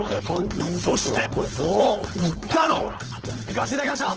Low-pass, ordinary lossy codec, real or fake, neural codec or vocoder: 7.2 kHz; Opus, 16 kbps; fake; codec, 16 kHz, 4.8 kbps, FACodec